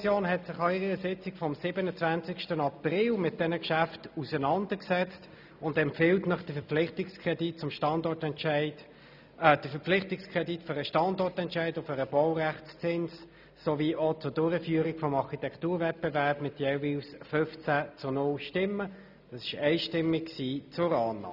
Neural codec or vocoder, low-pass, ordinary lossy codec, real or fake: none; 5.4 kHz; none; real